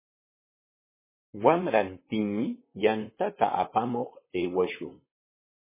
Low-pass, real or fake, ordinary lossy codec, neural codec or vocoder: 3.6 kHz; fake; MP3, 16 kbps; vocoder, 44.1 kHz, 128 mel bands, Pupu-Vocoder